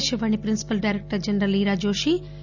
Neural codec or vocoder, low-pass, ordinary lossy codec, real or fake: none; 7.2 kHz; none; real